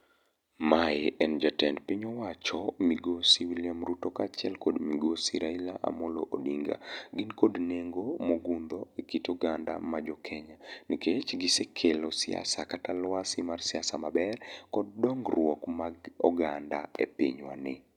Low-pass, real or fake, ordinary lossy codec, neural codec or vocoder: 19.8 kHz; real; none; none